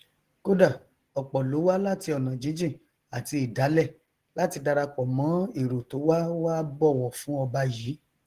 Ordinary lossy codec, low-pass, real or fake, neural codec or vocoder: Opus, 16 kbps; 14.4 kHz; real; none